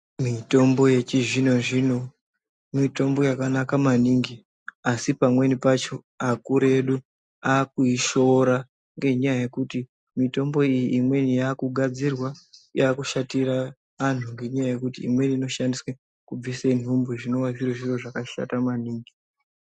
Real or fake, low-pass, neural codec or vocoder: real; 10.8 kHz; none